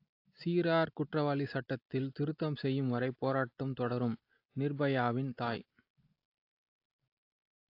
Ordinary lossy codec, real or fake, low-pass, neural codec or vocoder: AAC, 32 kbps; real; 5.4 kHz; none